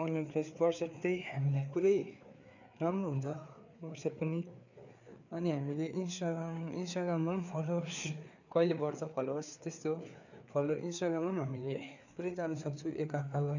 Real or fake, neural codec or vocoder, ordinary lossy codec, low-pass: fake; codec, 16 kHz, 4 kbps, FreqCodec, larger model; none; 7.2 kHz